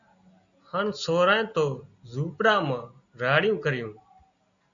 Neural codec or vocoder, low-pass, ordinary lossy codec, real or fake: none; 7.2 kHz; MP3, 96 kbps; real